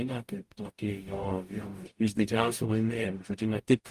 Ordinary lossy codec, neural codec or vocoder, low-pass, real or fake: Opus, 32 kbps; codec, 44.1 kHz, 0.9 kbps, DAC; 14.4 kHz; fake